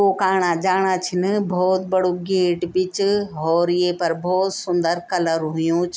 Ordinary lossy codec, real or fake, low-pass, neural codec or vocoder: none; real; none; none